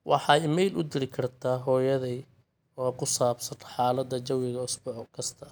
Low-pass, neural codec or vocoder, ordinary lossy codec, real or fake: none; none; none; real